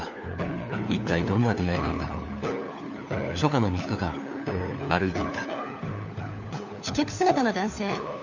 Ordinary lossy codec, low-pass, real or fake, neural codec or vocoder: none; 7.2 kHz; fake; codec, 16 kHz, 4 kbps, FunCodec, trained on LibriTTS, 50 frames a second